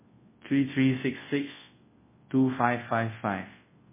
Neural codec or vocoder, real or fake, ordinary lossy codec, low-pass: codec, 24 kHz, 0.9 kbps, WavTokenizer, large speech release; fake; MP3, 16 kbps; 3.6 kHz